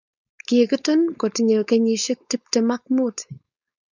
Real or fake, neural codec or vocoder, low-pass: fake; codec, 16 kHz, 4.8 kbps, FACodec; 7.2 kHz